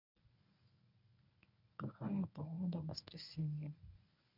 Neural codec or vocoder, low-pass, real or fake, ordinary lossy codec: codec, 24 kHz, 0.9 kbps, WavTokenizer, medium speech release version 1; 5.4 kHz; fake; none